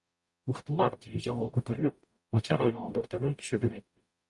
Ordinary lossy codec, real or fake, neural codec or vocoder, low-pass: Opus, 64 kbps; fake; codec, 44.1 kHz, 0.9 kbps, DAC; 10.8 kHz